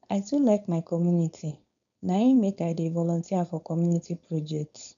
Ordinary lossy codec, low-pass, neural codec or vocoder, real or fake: none; 7.2 kHz; codec, 16 kHz, 4.8 kbps, FACodec; fake